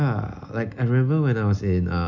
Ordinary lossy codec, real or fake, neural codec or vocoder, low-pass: none; real; none; 7.2 kHz